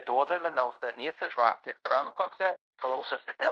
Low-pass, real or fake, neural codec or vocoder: 10.8 kHz; fake; codec, 16 kHz in and 24 kHz out, 0.9 kbps, LongCat-Audio-Codec, fine tuned four codebook decoder